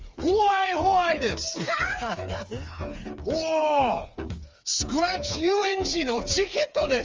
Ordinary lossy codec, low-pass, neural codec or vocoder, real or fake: Opus, 32 kbps; 7.2 kHz; codec, 16 kHz, 4 kbps, FreqCodec, smaller model; fake